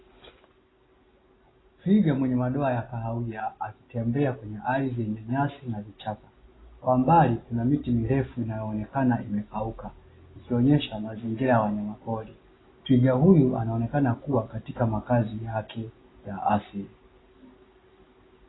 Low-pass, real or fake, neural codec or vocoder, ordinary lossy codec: 7.2 kHz; real; none; AAC, 16 kbps